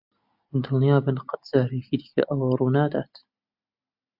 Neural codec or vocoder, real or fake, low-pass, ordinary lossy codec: none; real; 5.4 kHz; AAC, 48 kbps